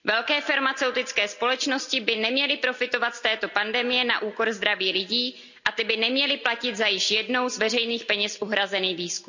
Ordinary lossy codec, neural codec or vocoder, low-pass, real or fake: none; none; 7.2 kHz; real